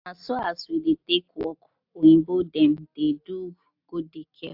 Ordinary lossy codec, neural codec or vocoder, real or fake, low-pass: AAC, 48 kbps; none; real; 5.4 kHz